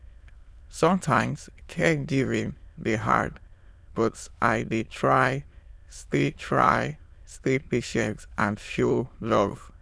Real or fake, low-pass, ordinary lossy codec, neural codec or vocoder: fake; none; none; autoencoder, 22.05 kHz, a latent of 192 numbers a frame, VITS, trained on many speakers